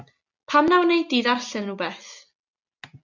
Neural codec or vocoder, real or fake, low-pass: none; real; 7.2 kHz